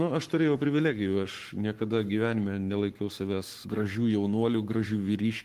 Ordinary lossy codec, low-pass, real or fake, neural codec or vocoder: Opus, 24 kbps; 14.4 kHz; fake; codec, 44.1 kHz, 7.8 kbps, Pupu-Codec